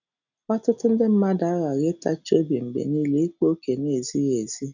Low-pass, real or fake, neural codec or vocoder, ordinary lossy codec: 7.2 kHz; real; none; none